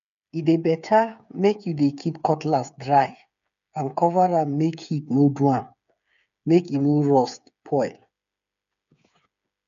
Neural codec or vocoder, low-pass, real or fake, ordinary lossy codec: codec, 16 kHz, 8 kbps, FreqCodec, smaller model; 7.2 kHz; fake; none